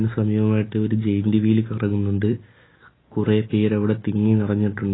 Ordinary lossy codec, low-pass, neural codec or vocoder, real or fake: AAC, 16 kbps; 7.2 kHz; none; real